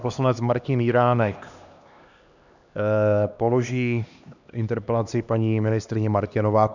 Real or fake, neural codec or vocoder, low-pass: fake; codec, 16 kHz, 2 kbps, X-Codec, HuBERT features, trained on LibriSpeech; 7.2 kHz